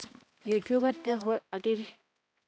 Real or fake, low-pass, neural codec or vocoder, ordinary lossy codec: fake; none; codec, 16 kHz, 1 kbps, X-Codec, HuBERT features, trained on balanced general audio; none